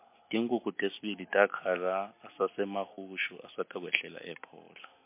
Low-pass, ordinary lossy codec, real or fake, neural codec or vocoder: 3.6 kHz; MP3, 24 kbps; real; none